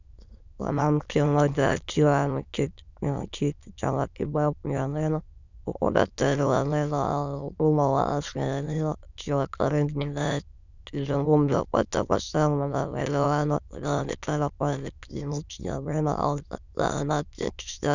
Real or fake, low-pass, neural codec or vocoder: fake; 7.2 kHz; autoencoder, 22.05 kHz, a latent of 192 numbers a frame, VITS, trained on many speakers